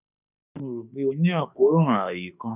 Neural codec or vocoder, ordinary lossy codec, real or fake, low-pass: autoencoder, 48 kHz, 32 numbers a frame, DAC-VAE, trained on Japanese speech; none; fake; 3.6 kHz